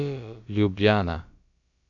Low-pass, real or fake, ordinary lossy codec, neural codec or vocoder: 7.2 kHz; fake; AAC, 64 kbps; codec, 16 kHz, about 1 kbps, DyCAST, with the encoder's durations